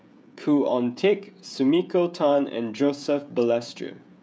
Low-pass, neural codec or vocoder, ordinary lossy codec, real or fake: none; codec, 16 kHz, 16 kbps, FreqCodec, smaller model; none; fake